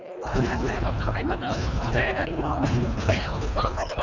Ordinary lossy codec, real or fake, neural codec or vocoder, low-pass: none; fake; codec, 24 kHz, 1.5 kbps, HILCodec; 7.2 kHz